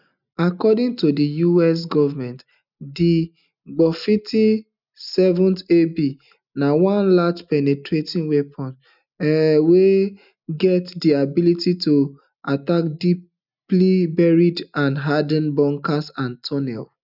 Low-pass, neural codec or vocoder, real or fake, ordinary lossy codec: 5.4 kHz; none; real; none